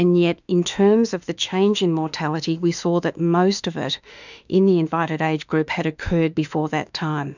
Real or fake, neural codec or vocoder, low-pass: fake; autoencoder, 48 kHz, 32 numbers a frame, DAC-VAE, trained on Japanese speech; 7.2 kHz